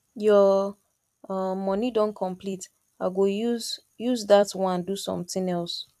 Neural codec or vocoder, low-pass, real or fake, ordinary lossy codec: vocoder, 44.1 kHz, 128 mel bands every 256 samples, BigVGAN v2; 14.4 kHz; fake; AAC, 96 kbps